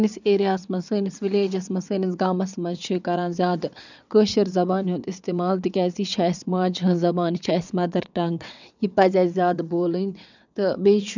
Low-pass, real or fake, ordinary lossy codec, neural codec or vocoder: 7.2 kHz; fake; none; vocoder, 22.05 kHz, 80 mel bands, WaveNeXt